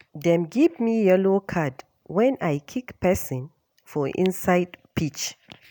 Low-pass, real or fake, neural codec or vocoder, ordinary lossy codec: none; real; none; none